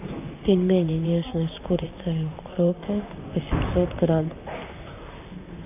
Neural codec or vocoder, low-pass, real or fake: vocoder, 44.1 kHz, 128 mel bands, Pupu-Vocoder; 3.6 kHz; fake